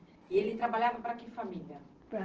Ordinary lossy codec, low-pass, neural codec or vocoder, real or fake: Opus, 16 kbps; 7.2 kHz; none; real